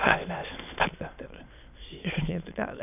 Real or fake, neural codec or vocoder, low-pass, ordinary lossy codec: fake; autoencoder, 22.05 kHz, a latent of 192 numbers a frame, VITS, trained on many speakers; 3.6 kHz; none